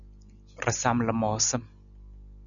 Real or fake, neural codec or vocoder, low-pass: real; none; 7.2 kHz